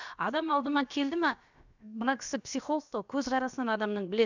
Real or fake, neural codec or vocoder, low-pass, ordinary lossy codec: fake; codec, 16 kHz, about 1 kbps, DyCAST, with the encoder's durations; 7.2 kHz; none